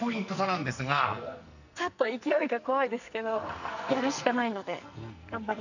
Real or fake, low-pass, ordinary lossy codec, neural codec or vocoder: fake; 7.2 kHz; none; codec, 44.1 kHz, 2.6 kbps, SNAC